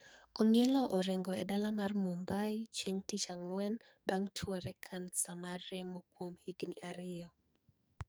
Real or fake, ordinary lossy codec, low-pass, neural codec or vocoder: fake; none; none; codec, 44.1 kHz, 2.6 kbps, SNAC